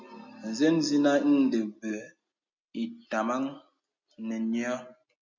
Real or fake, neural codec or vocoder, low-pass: real; none; 7.2 kHz